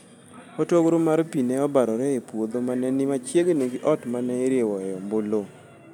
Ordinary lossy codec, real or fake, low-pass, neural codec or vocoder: none; real; 19.8 kHz; none